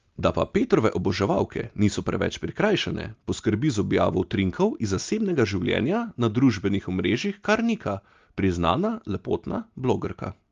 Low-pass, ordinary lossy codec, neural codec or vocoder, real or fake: 7.2 kHz; Opus, 32 kbps; none; real